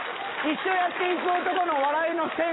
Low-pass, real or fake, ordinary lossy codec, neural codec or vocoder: 7.2 kHz; real; AAC, 16 kbps; none